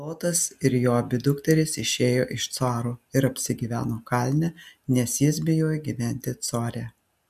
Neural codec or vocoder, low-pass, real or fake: none; 14.4 kHz; real